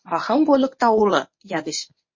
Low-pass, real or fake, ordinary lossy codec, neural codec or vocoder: 7.2 kHz; fake; MP3, 32 kbps; codec, 24 kHz, 0.9 kbps, WavTokenizer, medium speech release version 1